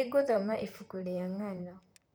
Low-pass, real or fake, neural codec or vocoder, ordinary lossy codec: none; fake; vocoder, 44.1 kHz, 128 mel bands, Pupu-Vocoder; none